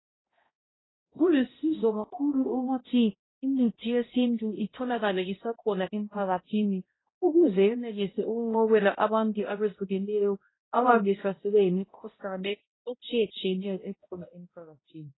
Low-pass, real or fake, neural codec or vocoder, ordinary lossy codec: 7.2 kHz; fake; codec, 16 kHz, 0.5 kbps, X-Codec, HuBERT features, trained on balanced general audio; AAC, 16 kbps